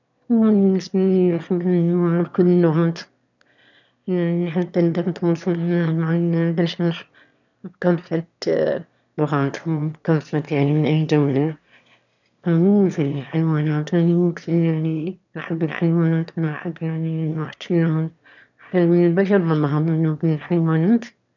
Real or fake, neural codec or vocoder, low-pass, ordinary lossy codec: fake; autoencoder, 22.05 kHz, a latent of 192 numbers a frame, VITS, trained on one speaker; 7.2 kHz; none